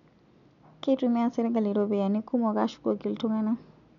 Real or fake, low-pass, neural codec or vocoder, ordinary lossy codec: real; 7.2 kHz; none; none